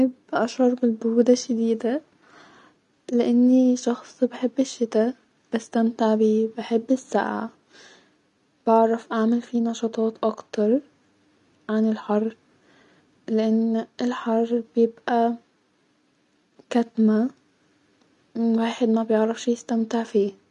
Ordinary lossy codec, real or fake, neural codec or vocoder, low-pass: none; real; none; 10.8 kHz